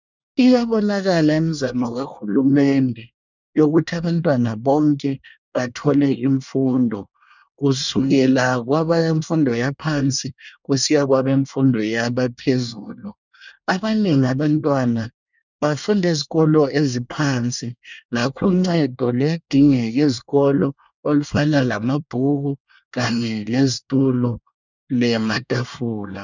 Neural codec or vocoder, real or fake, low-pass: codec, 24 kHz, 1 kbps, SNAC; fake; 7.2 kHz